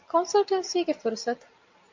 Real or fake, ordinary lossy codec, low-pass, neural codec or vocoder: real; MP3, 64 kbps; 7.2 kHz; none